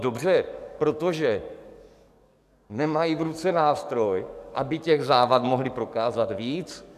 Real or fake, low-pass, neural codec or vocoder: fake; 14.4 kHz; codec, 44.1 kHz, 7.8 kbps, DAC